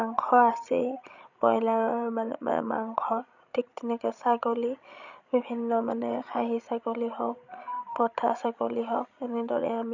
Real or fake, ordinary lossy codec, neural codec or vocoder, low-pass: fake; none; codec, 16 kHz, 16 kbps, FreqCodec, larger model; 7.2 kHz